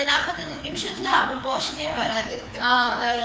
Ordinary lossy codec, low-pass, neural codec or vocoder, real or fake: none; none; codec, 16 kHz, 2 kbps, FreqCodec, larger model; fake